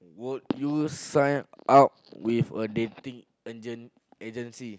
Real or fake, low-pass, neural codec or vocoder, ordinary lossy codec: real; none; none; none